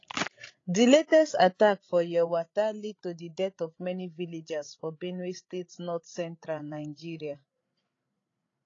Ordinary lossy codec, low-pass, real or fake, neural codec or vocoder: AAC, 32 kbps; 7.2 kHz; fake; codec, 16 kHz, 16 kbps, FreqCodec, larger model